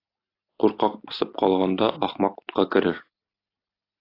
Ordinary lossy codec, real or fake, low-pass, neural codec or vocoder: AAC, 32 kbps; real; 5.4 kHz; none